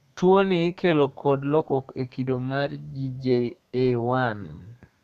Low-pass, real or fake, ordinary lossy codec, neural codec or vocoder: 14.4 kHz; fake; none; codec, 32 kHz, 1.9 kbps, SNAC